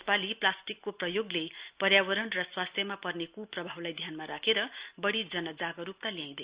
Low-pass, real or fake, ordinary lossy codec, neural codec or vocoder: 3.6 kHz; real; Opus, 64 kbps; none